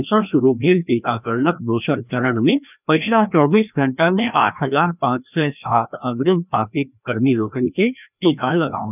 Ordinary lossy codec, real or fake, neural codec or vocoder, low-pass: none; fake; codec, 16 kHz, 1 kbps, FreqCodec, larger model; 3.6 kHz